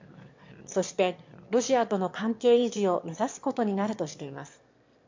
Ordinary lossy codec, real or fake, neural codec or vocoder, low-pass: MP3, 48 kbps; fake; autoencoder, 22.05 kHz, a latent of 192 numbers a frame, VITS, trained on one speaker; 7.2 kHz